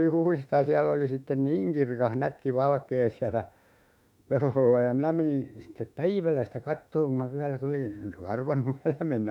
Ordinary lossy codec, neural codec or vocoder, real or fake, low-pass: none; autoencoder, 48 kHz, 32 numbers a frame, DAC-VAE, trained on Japanese speech; fake; 19.8 kHz